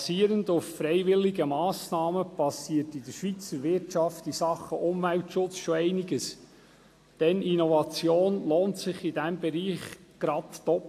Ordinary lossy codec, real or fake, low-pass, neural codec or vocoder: AAC, 64 kbps; real; 14.4 kHz; none